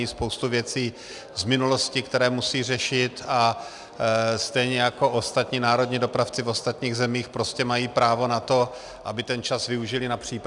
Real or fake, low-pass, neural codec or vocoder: real; 10.8 kHz; none